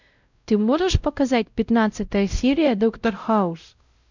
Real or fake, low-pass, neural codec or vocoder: fake; 7.2 kHz; codec, 16 kHz, 0.5 kbps, X-Codec, WavLM features, trained on Multilingual LibriSpeech